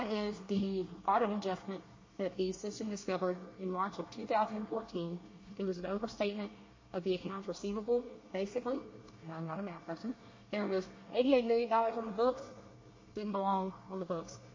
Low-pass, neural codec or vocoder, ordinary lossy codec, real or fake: 7.2 kHz; codec, 24 kHz, 1 kbps, SNAC; MP3, 32 kbps; fake